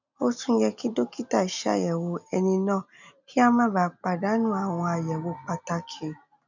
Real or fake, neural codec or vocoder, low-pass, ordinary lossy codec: real; none; 7.2 kHz; none